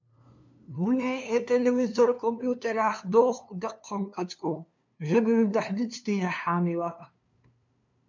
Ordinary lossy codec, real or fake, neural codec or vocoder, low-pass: MP3, 64 kbps; fake; codec, 16 kHz, 2 kbps, FunCodec, trained on LibriTTS, 25 frames a second; 7.2 kHz